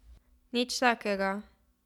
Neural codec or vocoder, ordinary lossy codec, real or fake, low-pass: none; none; real; 19.8 kHz